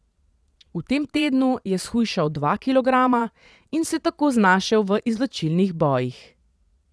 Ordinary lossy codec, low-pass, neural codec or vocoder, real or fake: none; none; vocoder, 22.05 kHz, 80 mel bands, Vocos; fake